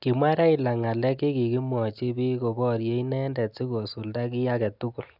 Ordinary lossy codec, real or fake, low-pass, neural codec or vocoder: none; real; 5.4 kHz; none